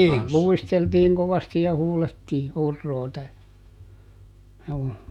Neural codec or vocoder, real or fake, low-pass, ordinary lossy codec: autoencoder, 48 kHz, 128 numbers a frame, DAC-VAE, trained on Japanese speech; fake; 19.8 kHz; none